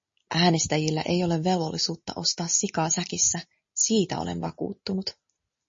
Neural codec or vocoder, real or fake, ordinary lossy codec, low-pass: none; real; MP3, 32 kbps; 7.2 kHz